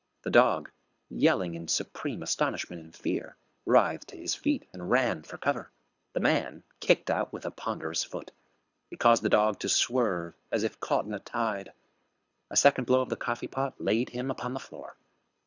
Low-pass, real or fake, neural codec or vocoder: 7.2 kHz; fake; codec, 24 kHz, 6 kbps, HILCodec